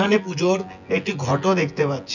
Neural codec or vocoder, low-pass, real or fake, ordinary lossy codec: vocoder, 24 kHz, 100 mel bands, Vocos; 7.2 kHz; fake; none